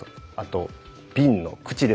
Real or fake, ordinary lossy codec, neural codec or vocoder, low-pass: real; none; none; none